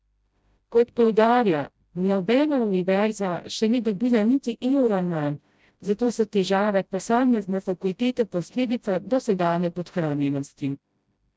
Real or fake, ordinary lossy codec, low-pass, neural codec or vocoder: fake; none; none; codec, 16 kHz, 0.5 kbps, FreqCodec, smaller model